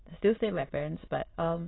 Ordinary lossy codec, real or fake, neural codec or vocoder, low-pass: AAC, 16 kbps; fake; autoencoder, 22.05 kHz, a latent of 192 numbers a frame, VITS, trained on many speakers; 7.2 kHz